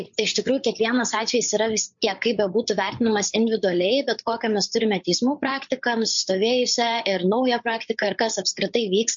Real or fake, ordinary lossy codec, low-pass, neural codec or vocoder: fake; MP3, 48 kbps; 7.2 kHz; codec, 16 kHz, 8 kbps, FreqCodec, larger model